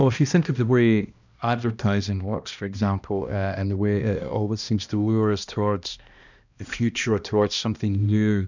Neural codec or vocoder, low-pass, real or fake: codec, 16 kHz, 1 kbps, X-Codec, HuBERT features, trained on balanced general audio; 7.2 kHz; fake